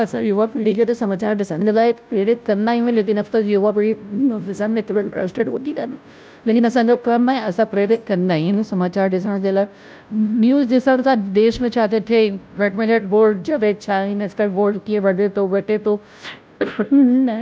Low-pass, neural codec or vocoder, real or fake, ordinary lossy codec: none; codec, 16 kHz, 0.5 kbps, FunCodec, trained on Chinese and English, 25 frames a second; fake; none